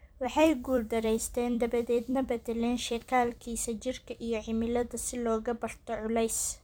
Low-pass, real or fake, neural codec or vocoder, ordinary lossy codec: none; fake; vocoder, 44.1 kHz, 128 mel bands every 256 samples, BigVGAN v2; none